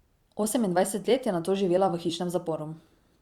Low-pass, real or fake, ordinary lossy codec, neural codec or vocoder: 19.8 kHz; fake; Opus, 64 kbps; vocoder, 44.1 kHz, 128 mel bands every 256 samples, BigVGAN v2